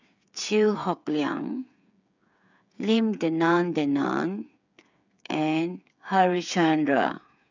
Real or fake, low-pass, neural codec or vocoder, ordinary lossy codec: fake; 7.2 kHz; codec, 16 kHz, 8 kbps, FreqCodec, smaller model; none